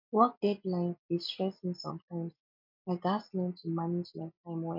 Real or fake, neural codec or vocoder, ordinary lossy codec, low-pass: real; none; MP3, 48 kbps; 5.4 kHz